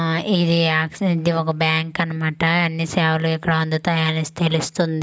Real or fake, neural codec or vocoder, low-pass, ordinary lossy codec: real; none; none; none